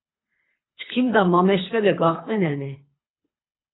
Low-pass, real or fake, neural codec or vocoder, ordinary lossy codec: 7.2 kHz; fake; codec, 24 kHz, 3 kbps, HILCodec; AAC, 16 kbps